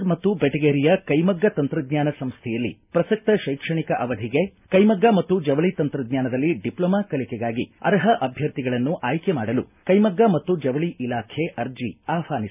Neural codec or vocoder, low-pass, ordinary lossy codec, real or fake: none; 3.6 kHz; none; real